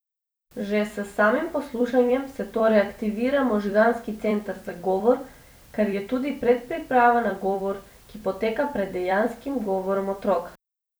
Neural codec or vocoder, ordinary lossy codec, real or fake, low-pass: vocoder, 44.1 kHz, 128 mel bands every 256 samples, BigVGAN v2; none; fake; none